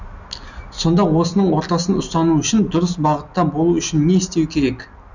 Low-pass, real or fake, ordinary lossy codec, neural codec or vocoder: 7.2 kHz; real; none; none